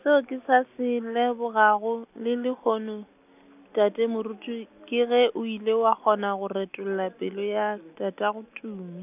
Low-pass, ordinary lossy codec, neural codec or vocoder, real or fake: 3.6 kHz; none; none; real